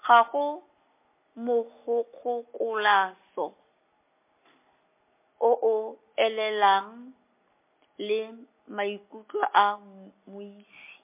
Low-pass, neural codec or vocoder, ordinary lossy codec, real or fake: 3.6 kHz; none; MP3, 24 kbps; real